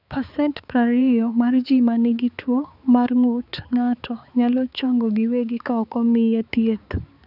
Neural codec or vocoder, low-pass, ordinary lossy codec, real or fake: codec, 16 kHz, 4 kbps, X-Codec, HuBERT features, trained on balanced general audio; 5.4 kHz; none; fake